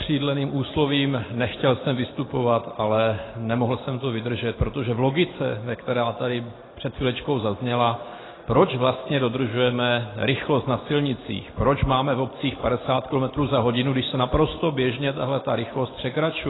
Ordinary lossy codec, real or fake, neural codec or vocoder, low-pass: AAC, 16 kbps; real; none; 7.2 kHz